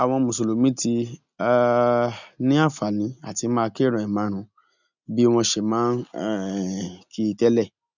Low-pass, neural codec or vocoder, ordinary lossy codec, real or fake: 7.2 kHz; none; none; real